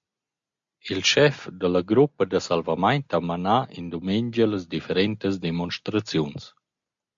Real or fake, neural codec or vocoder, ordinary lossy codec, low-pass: real; none; MP3, 48 kbps; 7.2 kHz